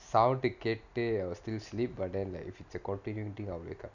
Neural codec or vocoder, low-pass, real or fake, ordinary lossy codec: none; 7.2 kHz; real; none